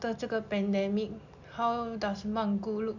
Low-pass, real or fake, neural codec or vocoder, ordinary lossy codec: 7.2 kHz; real; none; none